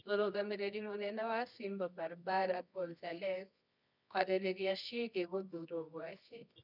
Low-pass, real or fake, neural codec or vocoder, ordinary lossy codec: 5.4 kHz; fake; codec, 24 kHz, 0.9 kbps, WavTokenizer, medium music audio release; none